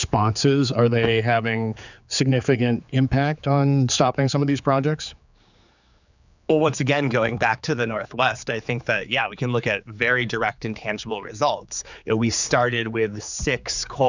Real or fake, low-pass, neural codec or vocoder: fake; 7.2 kHz; codec, 16 kHz, 4 kbps, X-Codec, HuBERT features, trained on general audio